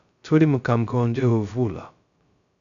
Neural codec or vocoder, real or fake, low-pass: codec, 16 kHz, 0.2 kbps, FocalCodec; fake; 7.2 kHz